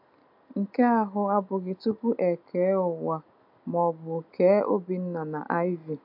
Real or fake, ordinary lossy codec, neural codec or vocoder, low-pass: real; MP3, 48 kbps; none; 5.4 kHz